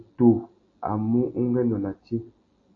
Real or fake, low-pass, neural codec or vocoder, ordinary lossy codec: real; 7.2 kHz; none; AAC, 32 kbps